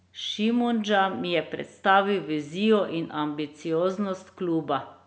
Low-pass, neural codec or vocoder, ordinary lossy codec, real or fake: none; none; none; real